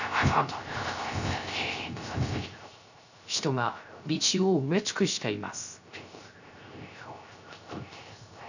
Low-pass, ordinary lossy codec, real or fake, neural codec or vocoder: 7.2 kHz; none; fake; codec, 16 kHz, 0.3 kbps, FocalCodec